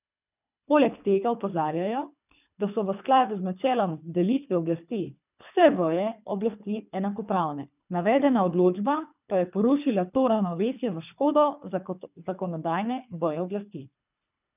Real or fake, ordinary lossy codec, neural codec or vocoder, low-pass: fake; none; codec, 24 kHz, 3 kbps, HILCodec; 3.6 kHz